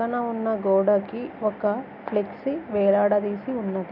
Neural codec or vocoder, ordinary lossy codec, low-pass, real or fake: none; none; 5.4 kHz; real